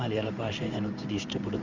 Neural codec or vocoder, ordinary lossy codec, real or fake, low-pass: codec, 16 kHz in and 24 kHz out, 1 kbps, XY-Tokenizer; none; fake; 7.2 kHz